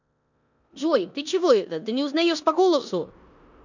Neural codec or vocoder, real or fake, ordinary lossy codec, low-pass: codec, 16 kHz in and 24 kHz out, 0.9 kbps, LongCat-Audio-Codec, four codebook decoder; fake; none; 7.2 kHz